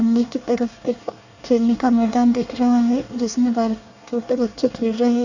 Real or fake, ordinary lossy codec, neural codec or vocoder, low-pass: fake; none; codec, 24 kHz, 1 kbps, SNAC; 7.2 kHz